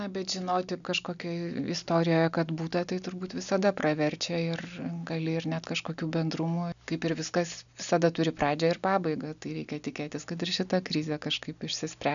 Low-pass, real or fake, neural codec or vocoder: 7.2 kHz; real; none